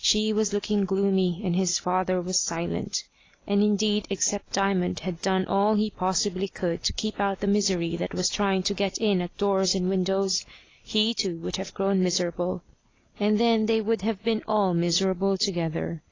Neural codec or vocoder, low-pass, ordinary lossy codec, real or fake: none; 7.2 kHz; AAC, 32 kbps; real